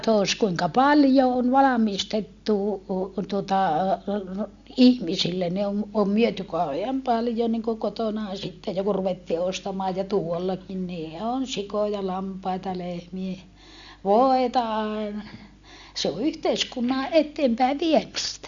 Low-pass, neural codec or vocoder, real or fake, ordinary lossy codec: 7.2 kHz; none; real; Opus, 64 kbps